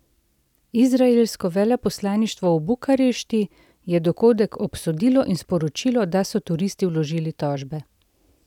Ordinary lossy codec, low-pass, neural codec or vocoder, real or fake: none; 19.8 kHz; none; real